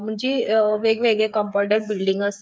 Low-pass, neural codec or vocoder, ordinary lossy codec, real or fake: none; codec, 16 kHz, 8 kbps, FreqCodec, smaller model; none; fake